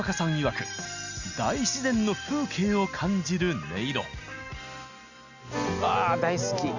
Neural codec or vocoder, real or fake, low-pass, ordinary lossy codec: none; real; 7.2 kHz; Opus, 64 kbps